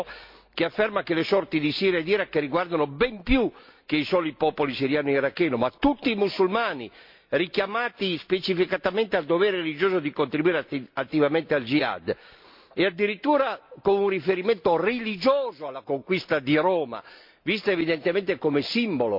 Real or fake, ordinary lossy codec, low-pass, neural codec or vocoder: real; MP3, 48 kbps; 5.4 kHz; none